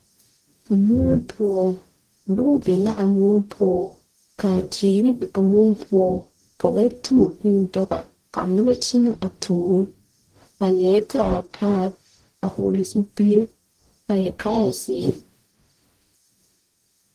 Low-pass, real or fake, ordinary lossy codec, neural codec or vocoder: 14.4 kHz; fake; Opus, 24 kbps; codec, 44.1 kHz, 0.9 kbps, DAC